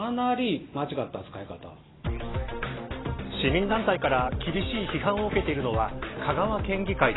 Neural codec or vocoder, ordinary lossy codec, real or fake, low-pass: none; AAC, 16 kbps; real; 7.2 kHz